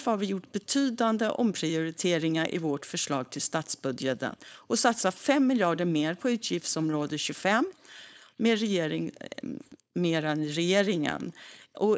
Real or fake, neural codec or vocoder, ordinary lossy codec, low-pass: fake; codec, 16 kHz, 4.8 kbps, FACodec; none; none